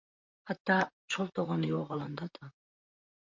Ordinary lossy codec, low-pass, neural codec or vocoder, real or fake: MP3, 48 kbps; 7.2 kHz; vocoder, 44.1 kHz, 128 mel bands, Pupu-Vocoder; fake